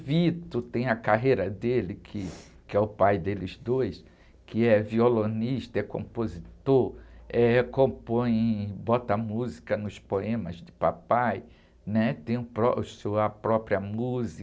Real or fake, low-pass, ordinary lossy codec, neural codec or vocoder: real; none; none; none